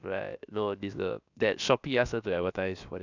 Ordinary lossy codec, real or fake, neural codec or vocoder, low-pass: none; fake; codec, 16 kHz, about 1 kbps, DyCAST, with the encoder's durations; 7.2 kHz